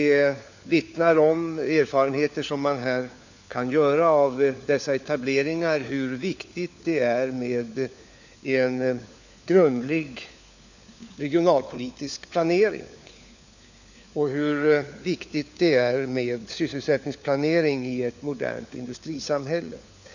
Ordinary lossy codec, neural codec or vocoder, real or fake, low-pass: none; codec, 16 kHz, 4 kbps, FunCodec, trained on LibriTTS, 50 frames a second; fake; 7.2 kHz